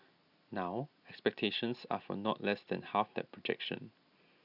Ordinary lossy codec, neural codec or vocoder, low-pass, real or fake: none; none; 5.4 kHz; real